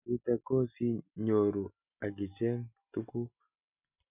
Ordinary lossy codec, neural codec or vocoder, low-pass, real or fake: none; none; 3.6 kHz; real